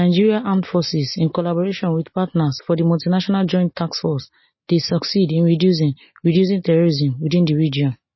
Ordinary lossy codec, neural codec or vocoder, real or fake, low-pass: MP3, 24 kbps; none; real; 7.2 kHz